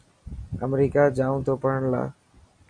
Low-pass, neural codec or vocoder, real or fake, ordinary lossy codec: 9.9 kHz; none; real; MP3, 48 kbps